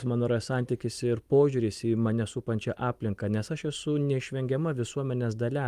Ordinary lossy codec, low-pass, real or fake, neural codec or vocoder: Opus, 32 kbps; 14.4 kHz; real; none